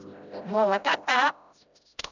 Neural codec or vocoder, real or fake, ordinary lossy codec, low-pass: codec, 16 kHz, 0.5 kbps, FreqCodec, smaller model; fake; none; 7.2 kHz